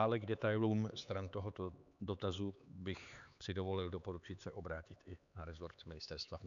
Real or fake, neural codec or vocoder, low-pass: fake; codec, 16 kHz, 4 kbps, X-Codec, HuBERT features, trained on LibriSpeech; 7.2 kHz